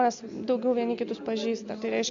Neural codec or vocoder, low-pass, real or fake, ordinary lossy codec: none; 7.2 kHz; real; MP3, 64 kbps